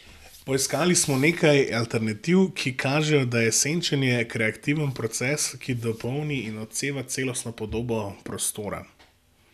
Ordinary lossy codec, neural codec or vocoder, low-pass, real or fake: none; none; 14.4 kHz; real